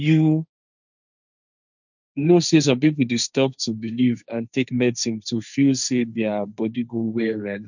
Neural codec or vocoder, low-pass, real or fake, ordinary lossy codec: codec, 16 kHz, 1.1 kbps, Voila-Tokenizer; 7.2 kHz; fake; none